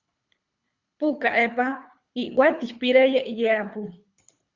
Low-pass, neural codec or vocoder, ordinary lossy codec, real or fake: 7.2 kHz; codec, 24 kHz, 3 kbps, HILCodec; Opus, 64 kbps; fake